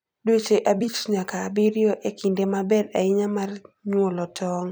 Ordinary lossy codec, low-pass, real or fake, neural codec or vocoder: none; none; fake; vocoder, 44.1 kHz, 128 mel bands every 256 samples, BigVGAN v2